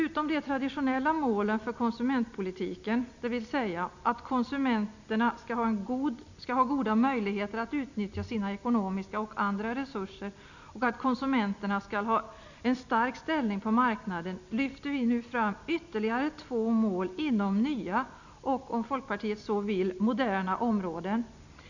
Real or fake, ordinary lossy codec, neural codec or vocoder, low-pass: real; none; none; 7.2 kHz